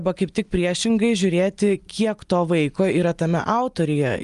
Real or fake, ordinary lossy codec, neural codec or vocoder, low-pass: real; Opus, 32 kbps; none; 10.8 kHz